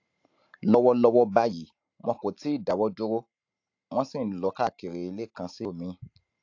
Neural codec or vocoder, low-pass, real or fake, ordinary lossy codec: none; 7.2 kHz; real; AAC, 48 kbps